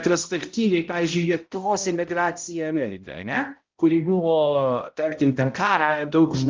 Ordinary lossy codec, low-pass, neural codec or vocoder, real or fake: Opus, 32 kbps; 7.2 kHz; codec, 16 kHz, 0.5 kbps, X-Codec, HuBERT features, trained on balanced general audio; fake